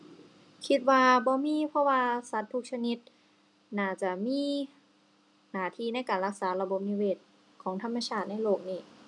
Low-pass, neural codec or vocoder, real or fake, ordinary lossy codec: 10.8 kHz; none; real; none